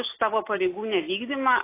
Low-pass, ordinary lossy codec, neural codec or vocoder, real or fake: 3.6 kHz; AAC, 24 kbps; none; real